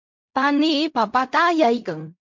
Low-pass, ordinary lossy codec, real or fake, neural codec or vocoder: 7.2 kHz; MP3, 48 kbps; fake; codec, 16 kHz in and 24 kHz out, 0.4 kbps, LongCat-Audio-Codec, fine tuned four codebook decoder